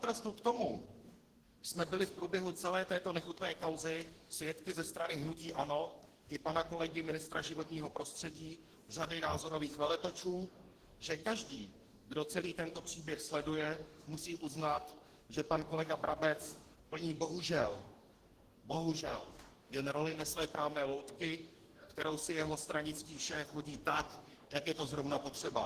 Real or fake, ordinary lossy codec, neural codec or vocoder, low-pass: fake; Opus, 16 kbps; codec, 44.1 kHz, 2.6 kbps, DAC; 14.4 kHz